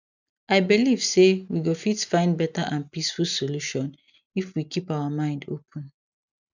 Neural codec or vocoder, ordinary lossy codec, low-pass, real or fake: none; none; 7.2 kHz; real